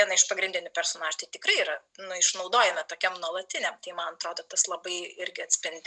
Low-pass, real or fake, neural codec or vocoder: 9.9 kHz; real; none